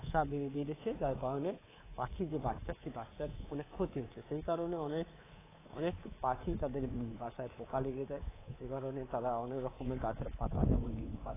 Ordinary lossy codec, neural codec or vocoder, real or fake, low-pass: AAC, 16 kbps; codec, 24 kHz, 3.1 kbps, DualCodec; fake; 3.6 kHz